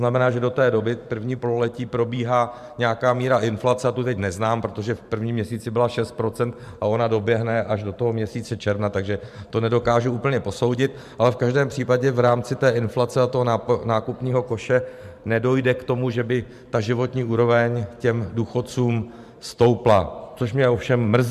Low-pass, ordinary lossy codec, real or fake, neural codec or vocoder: 14.4 kHz; MP3, 96 kbps; fake; vocoder, 44.1 kHz, 128 mel bands every 512 samples, BigVGAN v2